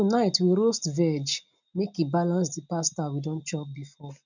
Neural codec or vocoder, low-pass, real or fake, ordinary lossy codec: none; 7.2 kHz; real; none